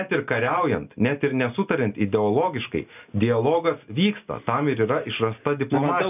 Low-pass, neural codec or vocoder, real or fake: 3.6 kHz; none; real